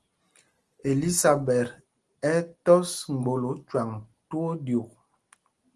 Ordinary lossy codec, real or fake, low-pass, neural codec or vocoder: Opus, 24 kbps; real; 10.8 kHz; none